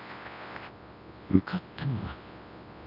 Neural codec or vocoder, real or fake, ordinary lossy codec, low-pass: codec, 24 kHz, 0.9 kbps, WavTokenizer, large speech release; fake; none; 5.4 kHz